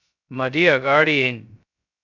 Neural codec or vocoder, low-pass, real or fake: codec, 16 kHz, 0.2 kbps, FocalCodec; 7.2 kHz; fake